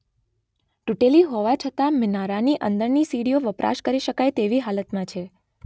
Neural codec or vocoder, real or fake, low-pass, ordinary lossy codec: none; real; none; none